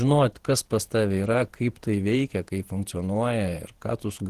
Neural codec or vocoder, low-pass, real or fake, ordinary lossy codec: vocoder, 48 kHz, 128 mel bands, Vocos; 14.4 kHz; fake; Opus, 16 kbps